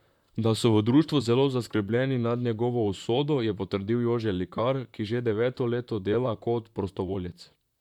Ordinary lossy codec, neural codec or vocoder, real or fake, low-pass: none; vocoder, 44.1 kHz, 128 mel bands, Pupu-Vocoder; fake; 19.8 kHz